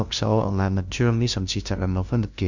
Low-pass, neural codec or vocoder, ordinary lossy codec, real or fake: 7.2 kHz; codec, 16 kHz, 0.5 kbps, FunCodec, trained on LibriTTS, 25 frames a second; Opus, 64 kbps; fake